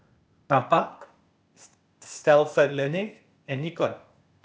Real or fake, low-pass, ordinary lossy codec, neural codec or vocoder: fake; none; none; codec, 16 kHz, 0.8 kbps, ZipCodec